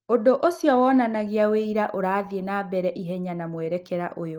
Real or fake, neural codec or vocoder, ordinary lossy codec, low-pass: real; none; Opus, 24 kbps; 19.8 kHz